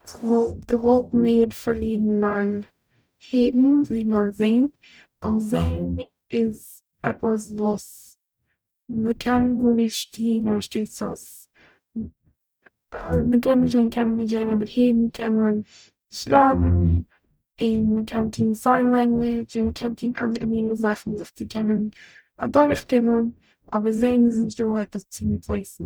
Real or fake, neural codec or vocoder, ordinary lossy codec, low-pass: fake; codec, 44.1 kHz, 0.9 kbps, DAC; none; none